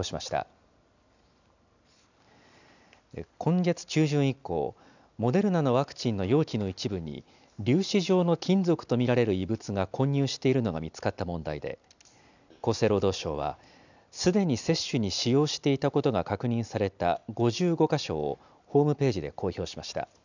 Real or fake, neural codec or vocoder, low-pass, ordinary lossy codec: real; none; 7.2 kHz; none